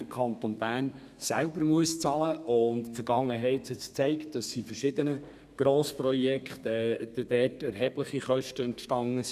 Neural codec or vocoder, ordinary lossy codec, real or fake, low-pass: codec, 32 kHz, 1.9 kbps, SNAC; none; fake; 14.4 kHz